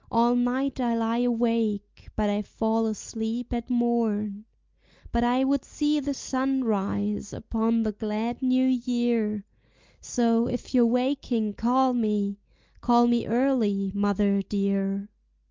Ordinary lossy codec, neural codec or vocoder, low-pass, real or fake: Opus, 24 kbps; none; 7.2 kHz; real